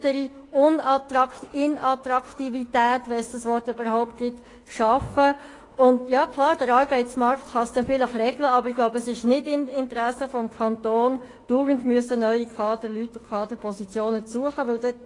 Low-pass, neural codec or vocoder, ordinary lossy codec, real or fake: 10.8 kHz; autoencoder, 48 kHz, 32 numbers a frame, DAC-VAE, trained on Japanese speech; AAC, 32 kbps; fake